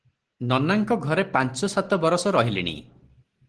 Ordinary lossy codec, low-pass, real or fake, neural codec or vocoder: Opus, 16 kbps; 10.8 kHz; real; none